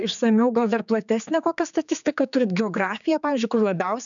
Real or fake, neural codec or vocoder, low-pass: fake; codec, 16 kHz, 4 kbps, X-Codec, HuBERT features, trained on general audio; 7.2 kHz